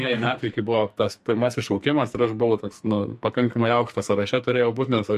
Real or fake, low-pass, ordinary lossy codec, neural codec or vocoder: fake; 14.4 kHz; MP3, 64 kbps; codec, 44.1 kHz, 2.6 kbps, SNAC